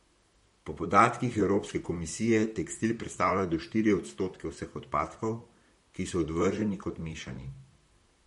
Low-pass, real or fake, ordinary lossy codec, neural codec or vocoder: 19.8 kHz; fake; MP3, 48 kbps; vocoder, 44.1 kHz, 128 mel bands, Pupu-Vocoder